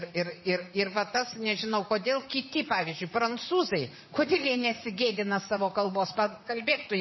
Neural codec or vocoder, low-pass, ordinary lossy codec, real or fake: vocoder, 44.1 kHz, 128 mel bands, Pupu-Vocoder; 7.2 kHz; MP3, 24 kbps; fake